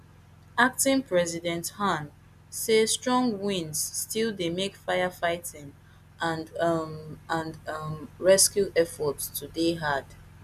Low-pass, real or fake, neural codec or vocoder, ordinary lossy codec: 14.4 kHz; real; none; none